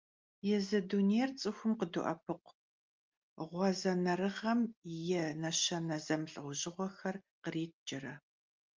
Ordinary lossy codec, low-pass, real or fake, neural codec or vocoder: Opus, 24 kbps; 7.2 kHz; real; none